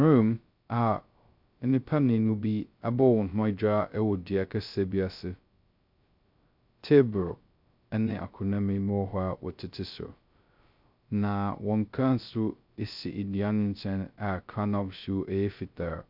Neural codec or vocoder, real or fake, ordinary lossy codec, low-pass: codec, 16 kHz, 0.2 kbps, FocalCodec; fake; MP3, 48 kbps; 5.4 kHz